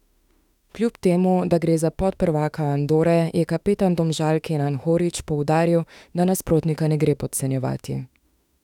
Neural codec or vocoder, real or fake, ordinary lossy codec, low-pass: autoencoder, 48 kHz, 32 numbers a frame, DAC-VAE, trained on Japanese speech; fake; none; 19.8 kHz